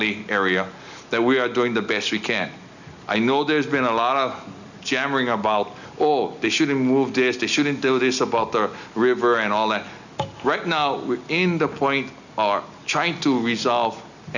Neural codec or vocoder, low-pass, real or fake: none; 7.2 kHz; real